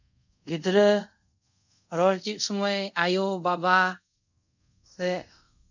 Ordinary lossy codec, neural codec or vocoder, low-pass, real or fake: none; codec, 24 kHz, 0.5 kbps, DualCodec; 7.2 kHz; fake